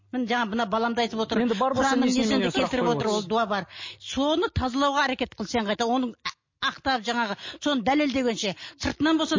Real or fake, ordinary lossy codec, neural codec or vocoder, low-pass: real; MP3, 32 kbps; none; 7.2 kHz